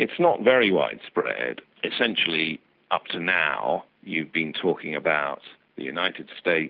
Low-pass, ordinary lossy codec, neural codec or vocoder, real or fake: 5.4 kHz; Opus, 24 kbps; none; real